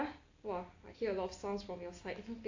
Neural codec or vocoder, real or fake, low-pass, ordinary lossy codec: none; real; 7.2 kHz; AAC, 48 kbps